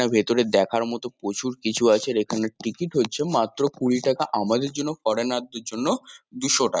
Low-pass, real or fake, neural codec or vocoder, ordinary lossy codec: none; real; none; none